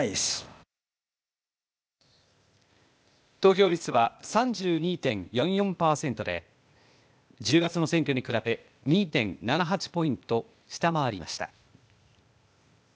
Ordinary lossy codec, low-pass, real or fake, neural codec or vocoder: none; none; fake; codec, 16 kHz, 0.8 kbps, ZipCodec